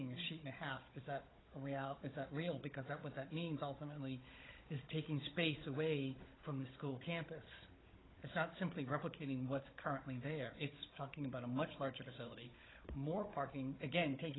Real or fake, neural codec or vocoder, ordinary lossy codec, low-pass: fake; codec, 16 kHz, 16 kbps, FunCodec, trained on Chinese and English, 50 frames a second; AAC, 16 kbps; 7.2 kHz